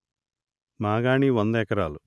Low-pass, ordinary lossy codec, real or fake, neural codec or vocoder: none; none; real; none